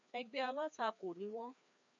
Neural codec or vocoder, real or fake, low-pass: codec, 16 kHz, 2 kbps, FreqCodec, larger model; fake; 7.2 kHz